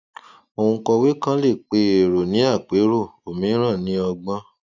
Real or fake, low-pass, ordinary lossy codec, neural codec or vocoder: real; 7.2 kHz; none; none